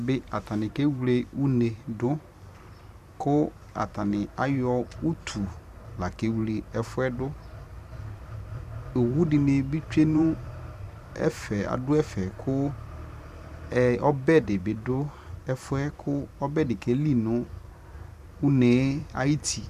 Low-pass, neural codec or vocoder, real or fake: 14.4 kHz; vocoder, 44.1 kHz, 128 mel bands every 512 samples, BigVGAN v2; fake